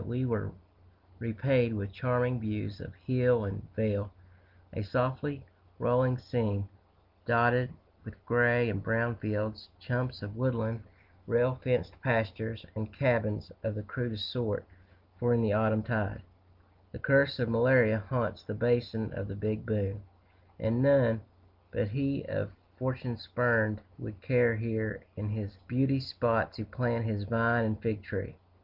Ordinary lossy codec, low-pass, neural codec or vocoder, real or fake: Opus, 24 kbps; 5.4 kHz; none; real